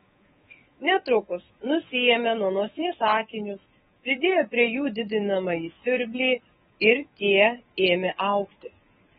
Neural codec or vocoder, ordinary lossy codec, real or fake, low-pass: codec, 24 kHz, 3.1 kbps, DualCodec; AAC, 16 kbps; fake; 10.8 kHz